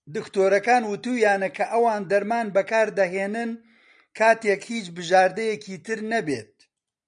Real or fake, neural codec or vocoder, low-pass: real; none; 9.9 kHz